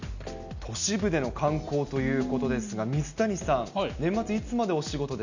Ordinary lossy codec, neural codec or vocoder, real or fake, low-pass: none; none; real; 7.2 kHz